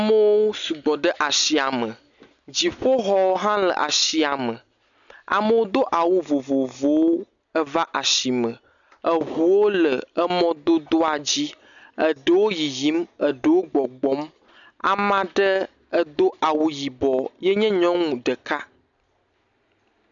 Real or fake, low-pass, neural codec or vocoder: real; 7.2 kHz; none